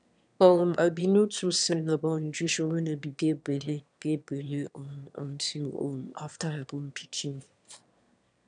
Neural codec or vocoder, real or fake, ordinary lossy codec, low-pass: autoencoder, 22.05 kHz, a latent of 192 numbers a frame, VITS, trained on one speaker; fake; none; 9.9 kHz